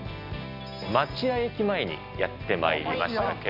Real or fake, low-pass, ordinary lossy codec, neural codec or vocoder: real; 5.4 kHz; none; none